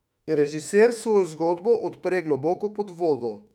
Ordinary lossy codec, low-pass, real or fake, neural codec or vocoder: none; 19.8 kHz; fake; autoencoder, 48 kHz, 32 numbers a frame, DAC-VAE, trained on Japanese speech